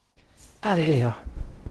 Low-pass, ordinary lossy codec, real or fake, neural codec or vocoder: 10.8 kHz; Opus, 16 kbps; fake; codec, 16 kHz in and 24 kHz out, 0.6 kbps, FocalCodec, streaming, 2048 codes